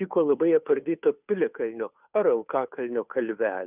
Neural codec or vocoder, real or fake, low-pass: codec, 16 kHz, 2 kbps, FunCodec, trained on Chinese and English, 25 frames a second; fake; 3.6 kHz